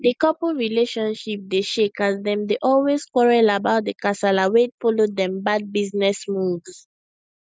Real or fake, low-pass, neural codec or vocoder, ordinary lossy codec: real; none; none; none